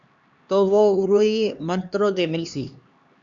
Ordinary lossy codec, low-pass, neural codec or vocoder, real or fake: Opus, 64 kbps; 7.2 kHz; codec, 16 kHz, 2 kbps, X-Codec, HuBERT features, trained on LibriSpeech; fake